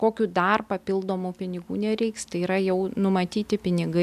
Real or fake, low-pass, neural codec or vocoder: real; 14.4 kHz; none